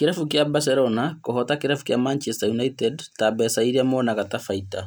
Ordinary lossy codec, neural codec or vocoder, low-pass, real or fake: none; none; none; real